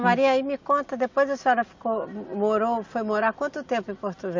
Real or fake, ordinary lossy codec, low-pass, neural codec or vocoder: real; MP3, 48 kbps; 7.2 kHz; none